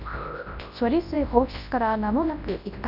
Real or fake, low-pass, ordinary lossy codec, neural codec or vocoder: fake; 5.4 kHz; none; codec, 24 kHz, 0.9 kbps, WavTokenizer, large speech release